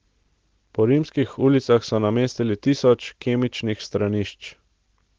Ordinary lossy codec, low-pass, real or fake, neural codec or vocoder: Opus, 16 kbps; 7.2 kHz; real; none